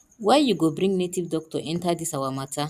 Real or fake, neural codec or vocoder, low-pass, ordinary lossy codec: real; none; 14.4 kHz; none